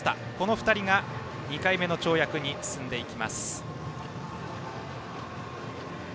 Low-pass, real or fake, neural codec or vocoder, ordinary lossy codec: none; real; none; none